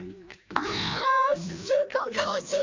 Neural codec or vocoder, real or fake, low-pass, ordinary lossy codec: codec, 16 kHz, 1 kbps, FreqCodec, larger model; fake; 7.2 kHz; MP3, 64 kbps